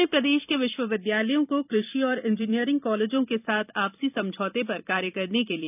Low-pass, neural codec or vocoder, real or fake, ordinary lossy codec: 3.6 kHz; vocoder, 44.1 kHz, 128 mel bands every 256 samples, BigVGAN v2; fake; none